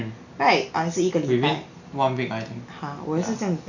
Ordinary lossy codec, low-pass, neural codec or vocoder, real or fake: none; 7.2 kHz; none; real